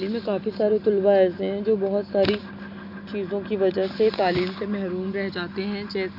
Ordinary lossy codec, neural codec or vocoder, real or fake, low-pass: none; none; real; 5.4 kHz